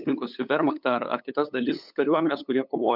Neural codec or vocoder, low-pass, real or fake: codec, 16 kHz, 8 kbps, FunCodec, trained on LibriTTS, 25 frames a second; 5.4 kHz; fake